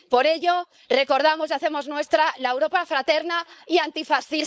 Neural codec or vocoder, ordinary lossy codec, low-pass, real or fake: codec, 16 kHz, 4.8 kbps, FACodec; none; none; fake